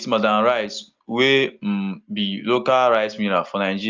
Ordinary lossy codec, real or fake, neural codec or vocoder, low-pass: Opus, 32 kbps; real; none; 7.2 kHz